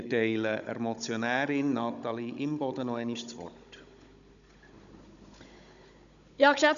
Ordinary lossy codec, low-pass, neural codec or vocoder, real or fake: MP3, 96 kbps; 7.2 kHz; codec, 16 kHz, 16 kbps, FunCodec, trained on Chinese and English, 50 frames a second; fake